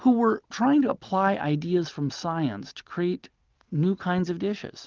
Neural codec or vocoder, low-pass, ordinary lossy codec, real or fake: none; 7.2 kHz; Opus, 24 kbps; real